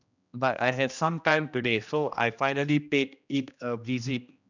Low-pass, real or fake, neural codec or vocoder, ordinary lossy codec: 7.2 kHz; fake; codec, 16 kHz, 1 kbps, X-Codec, HuBERT features, trained on general audio; none